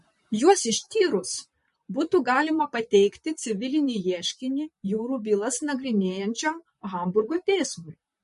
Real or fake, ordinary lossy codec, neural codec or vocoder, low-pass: fake; MP3, 48 kbps; vocoder, 44.1 kHz, 128 mel bands, Pupu-Vocoder; 14.4 kHz